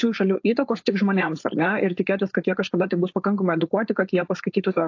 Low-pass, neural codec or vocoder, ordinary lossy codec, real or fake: 7.2 kHz; codec, 16 kHz, 4.8 kbps, FACodec; AAC, 48 kbps; fake